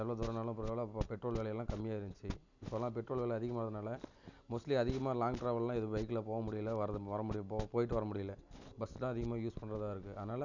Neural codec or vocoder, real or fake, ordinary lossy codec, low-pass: none; real; none; 7.2 kHz